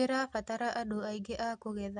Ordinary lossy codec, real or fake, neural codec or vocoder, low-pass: MP3, 64 kbps; real; none; 9.9 kHz